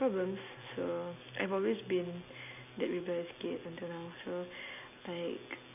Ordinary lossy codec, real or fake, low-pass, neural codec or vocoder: none; real; 3.6 kHz; none